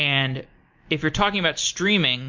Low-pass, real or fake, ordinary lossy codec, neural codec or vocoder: 7.2 kHz; real; MP3, 48 kbps; none